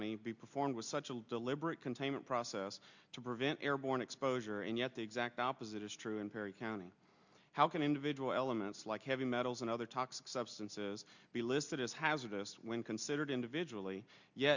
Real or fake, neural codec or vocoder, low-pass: real; none; 7.2 kHz